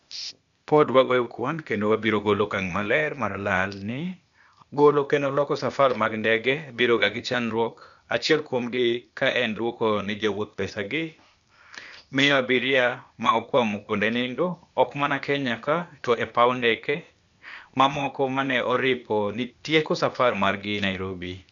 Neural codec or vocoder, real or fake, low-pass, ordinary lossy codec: codec, 16 kHz, 0.8 kbps, ZipCodec; fake; 7.2 kHz; none